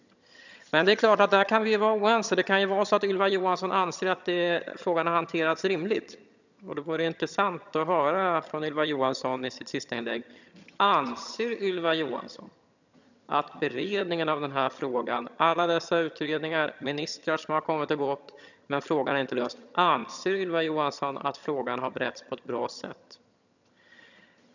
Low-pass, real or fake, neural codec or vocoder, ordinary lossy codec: 7.2 kHz; fake; vocoder, 22.05 kHz, 80 mel bands, HiFi-GAN; none